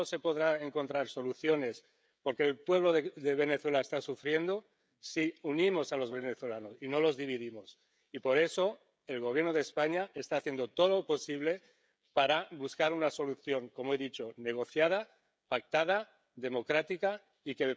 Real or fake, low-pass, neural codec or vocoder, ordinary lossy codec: fake; none; codec, 16 kHz, 16 kbps, FreqCodec, smaller model; none